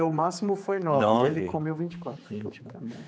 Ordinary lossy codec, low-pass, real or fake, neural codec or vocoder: none; none; fake; codec, 16 kHz, 4 kbps, X-Codec, HuBERT features, trained on general audio